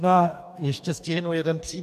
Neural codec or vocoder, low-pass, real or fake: codec, 44.1 kHz, 2.6 kbps, DAC; 14.4 kHz; fake